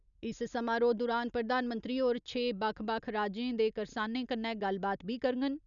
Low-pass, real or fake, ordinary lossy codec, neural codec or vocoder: 7.2 kHz; real; none; none